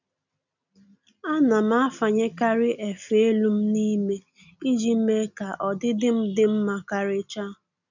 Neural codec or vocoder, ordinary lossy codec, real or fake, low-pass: none; none; real; 7.2 kHz